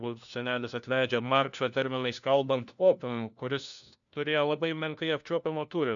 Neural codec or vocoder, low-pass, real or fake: codec, 16 kHz, 1 kbps, FunCodec, trained on LibriTTS, 50 frames a second; 7.2 kHz; fake